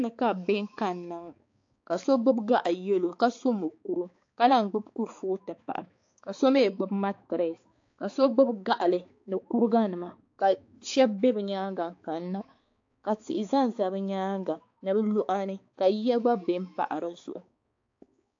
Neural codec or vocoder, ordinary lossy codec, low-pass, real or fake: codec, 16 kHz, 4 kbps, X-Codec, HuBERT features, trained on balanced general audio; AAC, 48 kbps; 7.2 kHz; fake